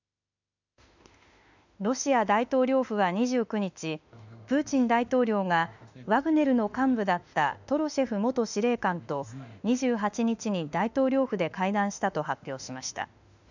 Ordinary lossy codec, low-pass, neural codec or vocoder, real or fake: none; 7.2 kHz; autoencoder, 48 kHz, 32 numbers a frame, DAC-VAE, trained on Japanese speech; fake